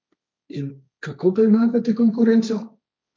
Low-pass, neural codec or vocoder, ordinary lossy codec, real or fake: none; codec, 16 kHz, 1.1 kbps, Voila-Tokenizer; none; fake